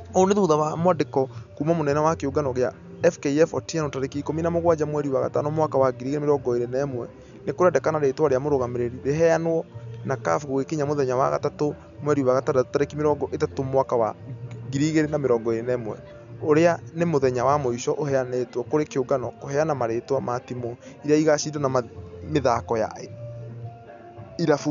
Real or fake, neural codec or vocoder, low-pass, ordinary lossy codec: real; none; 7.2 kHz; none